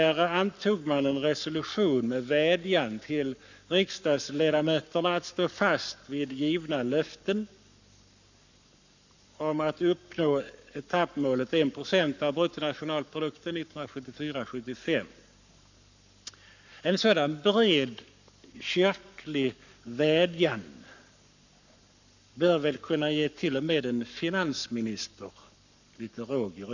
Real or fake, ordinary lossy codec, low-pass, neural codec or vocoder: fake; none; 7.2 kHz; codec, 44.1 kHz, 7.8 kbps, Pupu-Codec